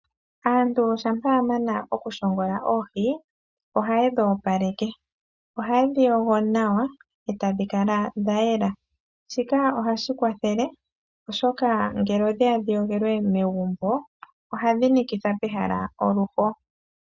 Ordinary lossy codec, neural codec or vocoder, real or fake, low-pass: Opus, 64 kbps; none; real; 7.2 kHz